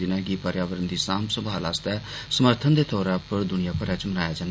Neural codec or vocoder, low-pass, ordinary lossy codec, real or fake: none; none; none; real